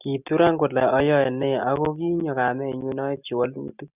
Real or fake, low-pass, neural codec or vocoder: real; 3.6 kHz; none